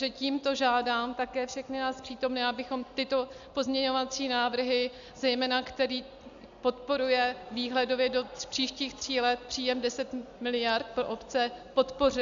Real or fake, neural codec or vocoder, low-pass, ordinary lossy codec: real; none; 7.2 kHz; AAC, 96 kbps